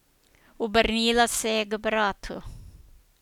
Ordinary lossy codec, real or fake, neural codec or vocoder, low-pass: none; real; none; 19.8 kHz